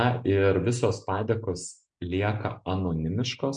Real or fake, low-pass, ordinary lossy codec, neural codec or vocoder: real; 9.9 kHz; MP3, 64 kbps; none